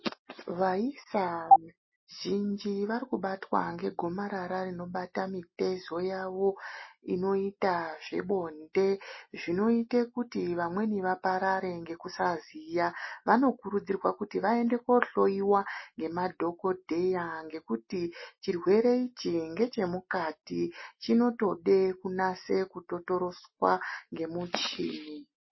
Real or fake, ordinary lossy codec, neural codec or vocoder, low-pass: real; MP3, 24 kbps; none; 7.2 kHz